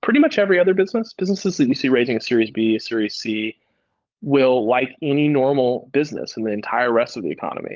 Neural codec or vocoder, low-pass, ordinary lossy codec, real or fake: codec, 16 kHz, 16 kbps, FunCodec, trained on LibriTTS, 50 frames a second; 7.2 kHz; Opus, 24 kbps; fake